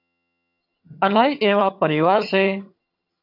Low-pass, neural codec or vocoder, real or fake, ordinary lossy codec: 5.4 kHz; vocoder, 22.05 kHz, 80 mel bands, HiFi-GAN; fake; AAC, 32 kbps